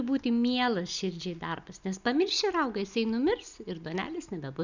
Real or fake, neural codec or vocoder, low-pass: real; none; 7.2 kHz